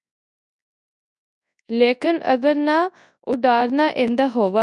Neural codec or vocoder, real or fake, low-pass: codec, 24 kHz, 0.9 kbps, WavTokenizer, large speech release; fake; 10.8 kHz